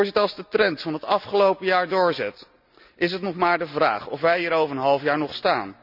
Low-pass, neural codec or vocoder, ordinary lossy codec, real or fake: 5.4 kHz; none; none; real